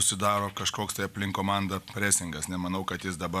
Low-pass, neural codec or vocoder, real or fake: 14.4 kHz; none; real